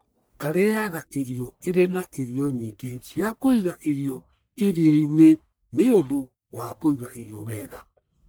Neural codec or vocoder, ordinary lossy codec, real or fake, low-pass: codec, 44.1 kHz, 1.7 kbps, Pupu-Codec; none; fake; none